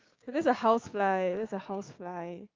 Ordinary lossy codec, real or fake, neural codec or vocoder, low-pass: Opus, 32 kbps; fake; codec, 16 kHz, 6 kbps, DAC; 7.2 kHz